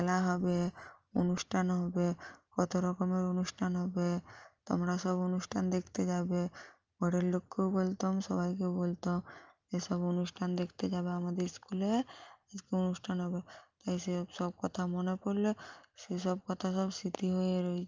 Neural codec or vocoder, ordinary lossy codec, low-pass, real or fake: none; Opus, 24 kbps; 7.2 kHz; real